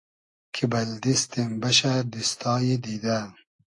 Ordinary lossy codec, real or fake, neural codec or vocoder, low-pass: AAC, 48 kbps; real; none; 10.8 kHz